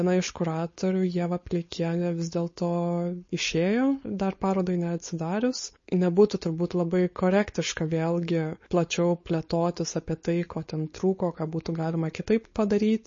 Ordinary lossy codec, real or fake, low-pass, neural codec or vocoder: MP3, 32 kbps; fake; 7.2 kHz; codec, 16 kHz, 4.8 kbps, FACodec